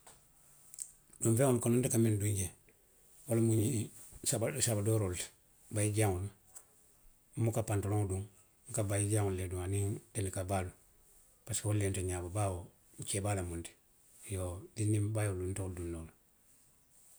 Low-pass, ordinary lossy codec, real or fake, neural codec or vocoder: none; none; real; none